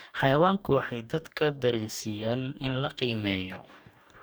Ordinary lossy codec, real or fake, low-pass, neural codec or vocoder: none; fake; none; codec, 44.1 kHz, 2.6 kbps, DAC